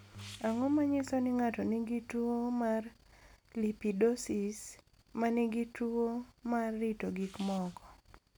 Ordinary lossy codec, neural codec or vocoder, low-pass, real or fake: none; none; none; real